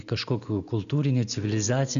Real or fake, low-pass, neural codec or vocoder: real; 7.2 kHz; none